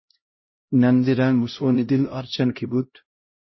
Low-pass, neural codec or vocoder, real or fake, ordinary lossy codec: 7.2 kHz; codec, 16 kHz, 0.5 kbps, X-Codec, HuBERT features, trained on LibriSpeech; fake; MP3, 24 kbps